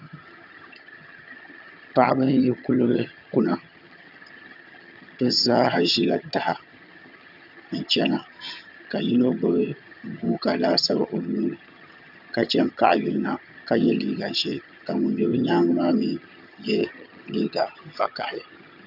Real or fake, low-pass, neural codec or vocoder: fake; 5.4 kHz; vocoder, 22.05 kHz, 80 mel bands, HiFi-GAN